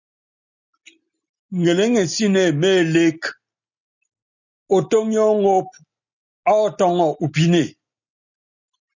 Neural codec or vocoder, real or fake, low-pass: none; real; 7.2 kHz